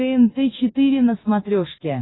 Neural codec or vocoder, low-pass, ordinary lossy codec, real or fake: none; 7.2 kHz; AAC, 16 kbps; real